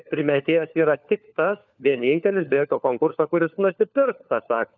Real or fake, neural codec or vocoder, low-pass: fake; codec, 16 kHz, 4 kbps, FunCodec, trained on LibriTTS, 50 frames a second; 7.2 kHz